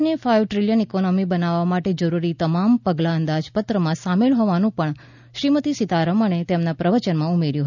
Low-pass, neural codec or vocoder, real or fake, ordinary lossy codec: 7.2 kHz; none; real; none